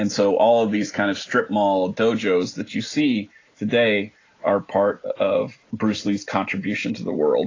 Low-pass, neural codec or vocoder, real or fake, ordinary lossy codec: 7.2 kHz; none; real; AAC, 32 kbps